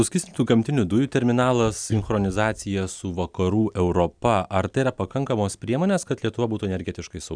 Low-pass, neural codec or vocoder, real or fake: 9.9 kHz; none; real